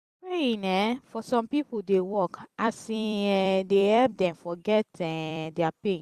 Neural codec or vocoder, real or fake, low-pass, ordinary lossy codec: vocoder, 44.1 kHz, 128 mel bands every 256 samples, BigVGAN v2; fake; 14.4 kHz; Opus, 64 kbps